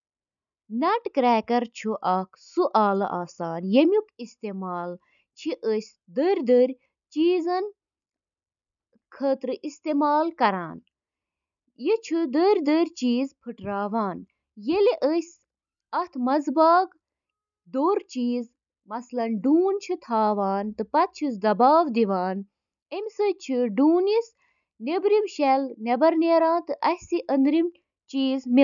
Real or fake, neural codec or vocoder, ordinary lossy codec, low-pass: real; none; none; 7.2 kHz